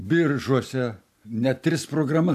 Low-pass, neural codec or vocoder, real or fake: 14.4 kHz; none; real